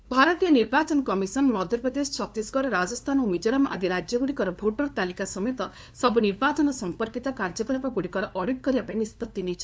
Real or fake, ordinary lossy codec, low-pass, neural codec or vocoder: fake; none; none; codec, 16 kHz, 2 kbps, FunCodec, trained on LibriTTS, 25 frames a second